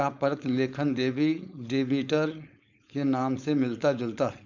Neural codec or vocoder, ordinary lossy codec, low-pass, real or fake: codec, 16 kHz, 4.8 kbps, FACodec; none; 7.2 kHz; fake